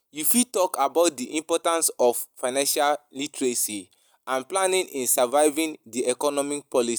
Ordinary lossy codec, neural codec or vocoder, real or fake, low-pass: none; none; real; none